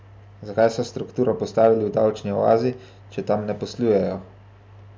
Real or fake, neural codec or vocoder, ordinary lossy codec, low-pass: real; none; none; none